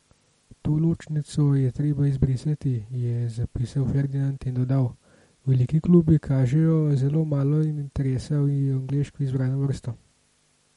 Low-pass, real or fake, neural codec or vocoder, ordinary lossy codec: 19.8 kHz; real; none; MP3, 48 kbps